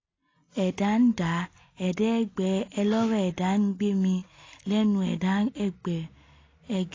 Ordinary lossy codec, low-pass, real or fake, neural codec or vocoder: AAC, 32 kbps; 7.2 kHz; real; none